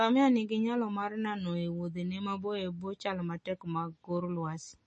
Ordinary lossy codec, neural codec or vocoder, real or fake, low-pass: MP3, 32 kbps; none; real; 10.8 kHz